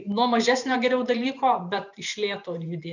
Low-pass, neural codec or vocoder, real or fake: 7.2 kHz; vocoder, 44.1 kHz, 128 mel bands every 512 samples, BigVGAN v2; fake